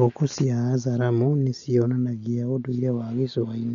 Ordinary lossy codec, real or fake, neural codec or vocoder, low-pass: none; real; none; 7.2 kHz